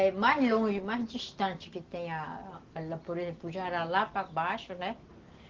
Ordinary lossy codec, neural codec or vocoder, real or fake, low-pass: Opus, 16 kbps; none; real; 7.2 kHz